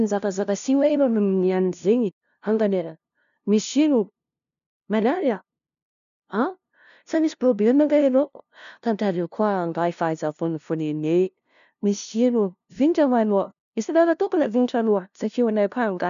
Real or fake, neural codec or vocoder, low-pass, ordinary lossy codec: fake; codec, 16 kHz, 0.5 kbps, FunCodec, trained on LibriTTS, 25 frames a second; 7.2 kHz; none